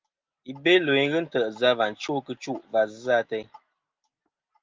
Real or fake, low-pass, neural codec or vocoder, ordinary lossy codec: real; 7.2 kHz; none; Opus, 24 kbps